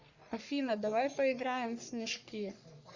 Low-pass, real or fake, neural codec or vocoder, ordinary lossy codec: 7.2 kHz; fake; codec, 44.1 kHz, 3.4 kbps, Pupu-Codec; Opus, 64 kbps